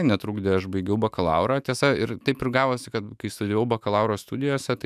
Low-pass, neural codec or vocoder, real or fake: 14.4 kHz; autoencoder, 48 kHz, 128 numbers a frame, DAC-VAE, trained on Japanese speech; fake